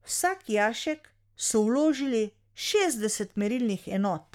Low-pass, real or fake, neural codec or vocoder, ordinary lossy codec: 19.8 kHz; fake; codec, 44.1 kHz, 7.8 kbps, Pupu-Codec; MP3, 96 kbps